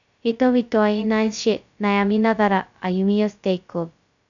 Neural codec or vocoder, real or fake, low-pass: codec, 16 kHz, 0.2 kbps, FocalCodec; fake; 7.2 kHz